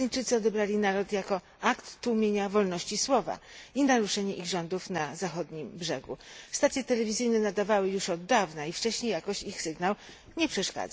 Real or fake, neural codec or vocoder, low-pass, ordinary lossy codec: real; none; none; none